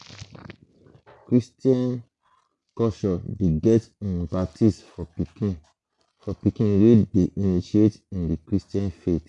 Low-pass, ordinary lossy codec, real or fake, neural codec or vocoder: 10.8 kHz; none; real; none